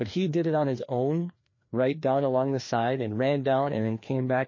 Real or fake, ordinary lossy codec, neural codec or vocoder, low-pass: fake; MP3, 32 kbps; codec, 16 kHz, 2 kbps, FreqCodec, larger model; 7.2 kHz